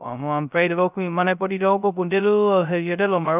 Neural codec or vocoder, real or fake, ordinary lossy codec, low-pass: codec, 16 kHz, 0.2 kbps, FocalCodec; fake; none; 3.6 kHz